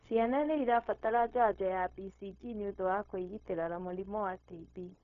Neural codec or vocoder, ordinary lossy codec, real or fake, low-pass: codec, 16 kHz, 0.4 kbps, LongCat-Audio-Codec; none; fake; 7.2 kHz